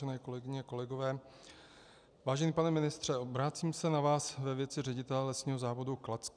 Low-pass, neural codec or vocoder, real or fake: 9.9 kHz; none; real